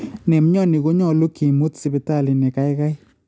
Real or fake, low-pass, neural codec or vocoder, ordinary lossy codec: real; none; none; none